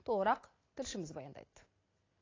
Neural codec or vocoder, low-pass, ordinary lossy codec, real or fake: none; 7.2 kHz; AAC, 32 kbps; real